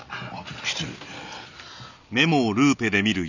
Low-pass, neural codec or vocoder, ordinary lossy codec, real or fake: 7.2 kHz; none; none; real